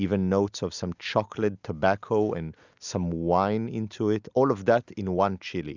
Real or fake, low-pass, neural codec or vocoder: real; 7.2 kHz; none